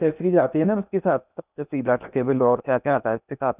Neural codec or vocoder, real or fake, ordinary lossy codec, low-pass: codec, 16 kHz in and 24 kHz out, 0.8 kbps, FocalCodec, streaming, 65536 codes; fake; none; 3.6 kHz